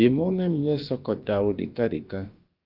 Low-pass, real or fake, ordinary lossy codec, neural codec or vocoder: 5.4 kHz; fake; Opus, 32 kbps; codec, 16 kHz, about 1 kbps, DyCAST, with the encoder's durations